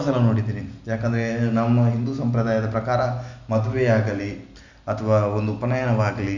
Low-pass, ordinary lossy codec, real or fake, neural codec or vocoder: 7.2 kHz; none; real; none